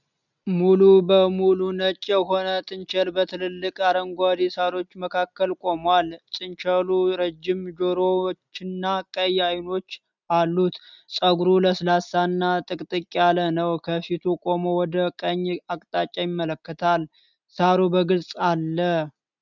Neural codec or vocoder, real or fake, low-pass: none; real; 7.2 kHz